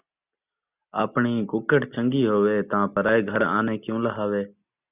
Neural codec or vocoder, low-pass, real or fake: none; 3.6 kHz; real